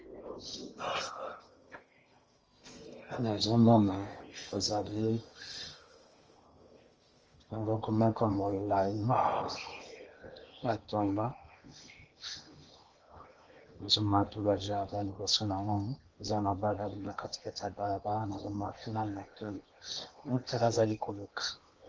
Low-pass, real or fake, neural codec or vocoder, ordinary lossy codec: 7.2 kHz; fake; codec, 16 kHz in and 24 kHz out, 0.8 kbps, FocalCodec, streaming, 65536 codes; Opus, 24 kbps